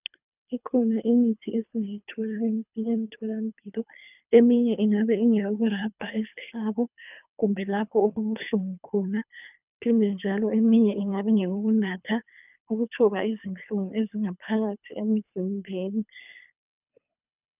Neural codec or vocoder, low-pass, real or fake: codec, 24 kHz, 3 kbps, HILCodec; 3.6 kHz; fake